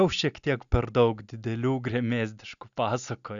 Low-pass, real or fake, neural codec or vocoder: 7.2 kHz; real; none